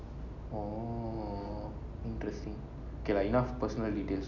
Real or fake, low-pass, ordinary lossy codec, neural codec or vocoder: real; 7.2 kHz; none; none